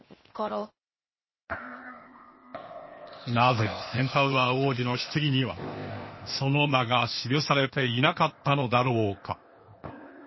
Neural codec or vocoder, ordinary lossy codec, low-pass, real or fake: codec, 16 kHz, 0.8 kbps, ZipCodec; MP3, 24 kbps; 7.2 kHz; fake